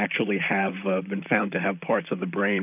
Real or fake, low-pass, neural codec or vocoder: fake; 3.6 kHz; vocoder, 44.1 kHz, 128 mel bands, Pupu-Vocoder